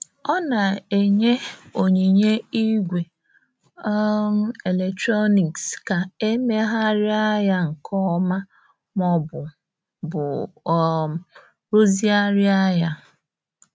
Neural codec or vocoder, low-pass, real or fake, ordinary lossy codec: none; none; real; none